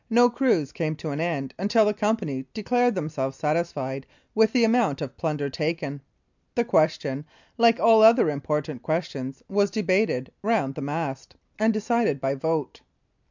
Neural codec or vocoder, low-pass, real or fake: none; 7.2 kHz; real